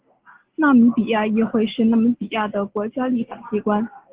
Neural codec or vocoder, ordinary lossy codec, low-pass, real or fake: none; Opus, 16 kbps; 3.6 kHz; real